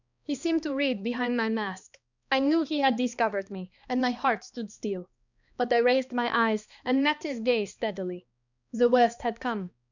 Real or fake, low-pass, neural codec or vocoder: fake; 7.2 kHz; codec, 16 kHz, 2 kbps, X-Codec, HuBERT features, trained on balanced general audio